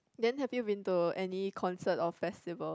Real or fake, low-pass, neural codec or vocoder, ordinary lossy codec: real; none; none; none